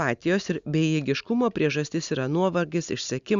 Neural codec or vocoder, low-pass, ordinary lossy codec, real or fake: none; 7.2 kHz; Opus, 64 kbps; real